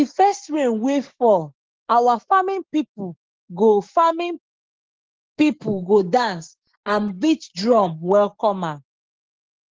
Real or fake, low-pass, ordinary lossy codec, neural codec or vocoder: fake; 7.2 kHz; Opus, 16 kbps; codec, 16 kHz, 6 kbps, DAC